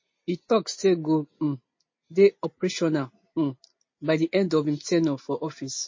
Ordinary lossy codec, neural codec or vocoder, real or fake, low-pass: MP3, 32 kbps; none; real; 7.2 kHz